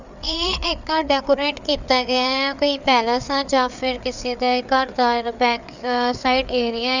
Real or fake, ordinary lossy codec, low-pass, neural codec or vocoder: fake; none; 7.2 kHz; codec, 16 kHz, 4 kbps, FreqCodec, larger model